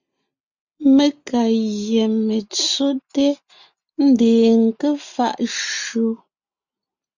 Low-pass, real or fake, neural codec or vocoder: 7.2 kHz; real; none